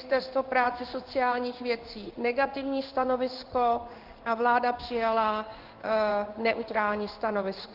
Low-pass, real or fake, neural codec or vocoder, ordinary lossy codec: 5.4 kHz; fake; codec, 16 kHz in and 24 kHz out, 1 kbps, XY-Tokenizer; Opus, 32 kbps